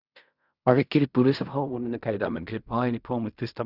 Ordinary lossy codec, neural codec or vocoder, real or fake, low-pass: Opus, 64 kbps; codec, 16 kHz in and 24 kHz out, 0.4 kbps, LongCat-Audio-Codec, fine tuned four codebook decoder; fake; 5.4 kHz